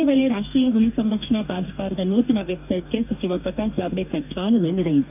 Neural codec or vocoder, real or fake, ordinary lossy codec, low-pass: codec, 44.1 kHz, 2.6 kbps, DAC; fake; none; 3.6 kHz